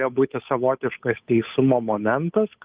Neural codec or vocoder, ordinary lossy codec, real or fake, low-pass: codec, 16 kHz, 8 kbps, FunCodec, trained on Chinese and English, 25 frames a second; Opus, 32 kbps; fake; 3.6 kHz